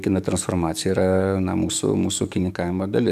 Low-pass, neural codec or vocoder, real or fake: 14.4 kHz; codec, 44.1 kHz, 7.8 kbps, DAC; fake